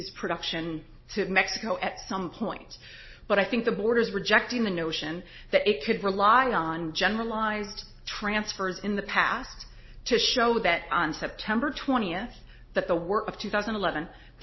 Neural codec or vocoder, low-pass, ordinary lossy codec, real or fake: none; 7.2 kHz; MP3, 24 kbps; real